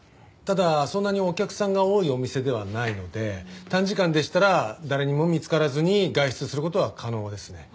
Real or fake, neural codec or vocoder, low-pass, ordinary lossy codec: real; none; none; none